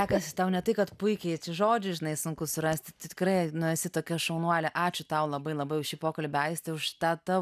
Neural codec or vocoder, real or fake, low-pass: none; real; 14.4 kHz